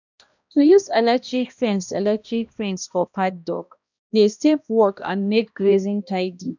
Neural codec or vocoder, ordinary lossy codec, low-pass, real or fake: codec, 16 kHz, 1 kbps, X-Codec, HuBERT features, trained on balanced general audio; none; 7.2 kHz; fake